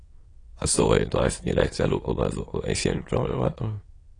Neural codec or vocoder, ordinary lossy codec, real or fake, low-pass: autoencoder, 22.05 kHz, a latent of 192 numbers a frame, VITS, trained on many speakers; AAC, 32 kbps; fake; 9.9 kHz